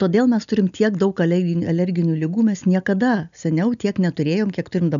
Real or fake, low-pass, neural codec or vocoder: real; 7.2 kHz; none